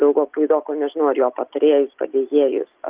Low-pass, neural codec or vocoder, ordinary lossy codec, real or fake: 3.6 kHz; none; Opus, 32 kbps; real